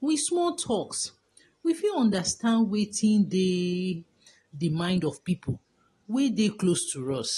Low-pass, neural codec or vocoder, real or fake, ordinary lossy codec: 19.8 kHz; none; real; AAC, 32 kbps